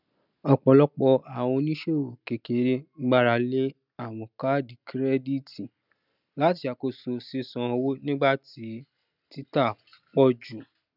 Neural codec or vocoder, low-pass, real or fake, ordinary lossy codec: none; 5.4 kHz; real; none